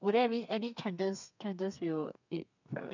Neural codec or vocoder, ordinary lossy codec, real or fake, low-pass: codec, 32 kHz, 1.9 kbps, SNAC; none; fake; 7.2 kHz